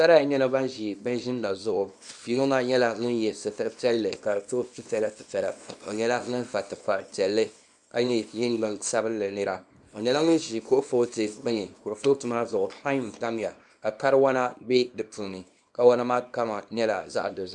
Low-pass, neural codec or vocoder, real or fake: 10.8 kHz; codec, 24 kHz, 0.9 kbps, WavTokenizer, small release; fake